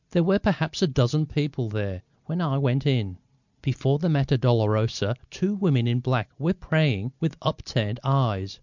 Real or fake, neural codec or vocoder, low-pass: real; none; 7.2 kHz